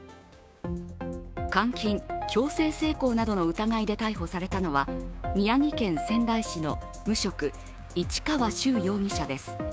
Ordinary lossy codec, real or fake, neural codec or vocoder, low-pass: none; fake; codec, 16 kHz, 6 kbps, DAC; none